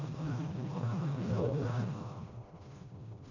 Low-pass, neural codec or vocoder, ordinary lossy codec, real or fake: 7.2 kHz; codec, 16 kHz, 1 kbps, FreqCodec, smaller model; none; fake